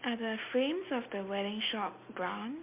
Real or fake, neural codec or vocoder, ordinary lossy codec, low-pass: real; none; MP3, 32 kbps; 3.6 kHz